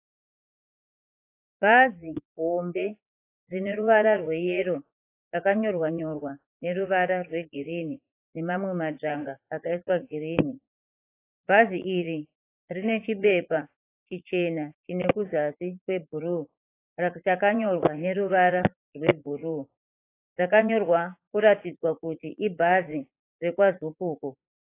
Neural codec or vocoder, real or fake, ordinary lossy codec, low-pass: vocoder, 44.1 kHz, 80 mel bands, Vocos; fake; AAC, 24 kbps; 3.6 kHz